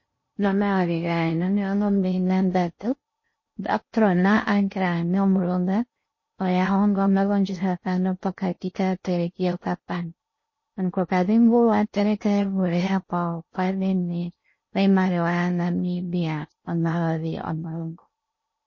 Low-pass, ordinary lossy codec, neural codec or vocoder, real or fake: 7.2 kHz; MP3, 32 kbps; codec, 16 kHz in and 24 kHz out, 0.6 kbps, FocalCodec, streaming, 4096 codes; fake